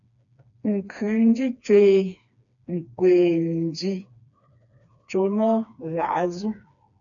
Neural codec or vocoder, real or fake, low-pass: codec, 16 kHz, 2 kbps, FreqCodec, smaller model; fake; 7.2 kHz